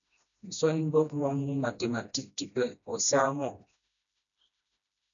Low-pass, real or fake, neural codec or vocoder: 7.2 kHz; fake; codec, 16 kHz, 1 kbps, FreqCodec, smaller model